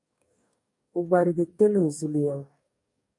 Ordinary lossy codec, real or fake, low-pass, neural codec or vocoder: MP3, 64 kbps; fake; 10.8 kHz; codec, 44.1 kHz, 2.6 kbps, DAC